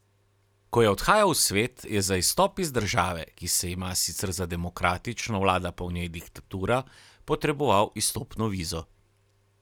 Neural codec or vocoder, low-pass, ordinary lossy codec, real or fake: none; 19.8 kHz; none; real